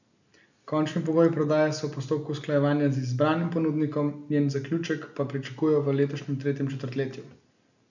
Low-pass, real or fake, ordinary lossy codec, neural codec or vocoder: 7.2 kHz; real; none; none